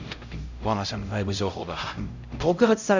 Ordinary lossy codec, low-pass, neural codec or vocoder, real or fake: none; 7.2 kHz; codec, 16 kHz, 0.5 kbps, X-Codec, HuBERT features, trained on LibriSpeech; fake